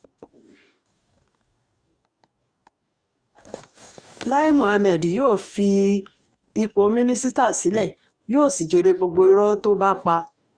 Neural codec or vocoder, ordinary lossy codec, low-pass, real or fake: codec, 44.1 kHz, 2.6 kbps, DAC; Opus, 64 kbps; 9.9 kHz; fake